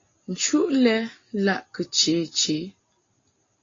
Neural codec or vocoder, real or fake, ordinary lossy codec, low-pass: none; real; AAC, 32 kbps; 7.2 kHz